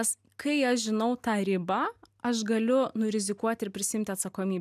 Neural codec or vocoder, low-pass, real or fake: none; 14.4 kHz; real